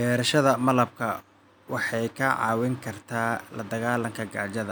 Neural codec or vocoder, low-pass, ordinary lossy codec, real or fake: none; none; none; real